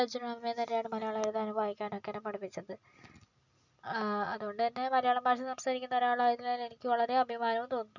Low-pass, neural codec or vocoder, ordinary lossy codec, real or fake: 7.2 kHz; none; none; real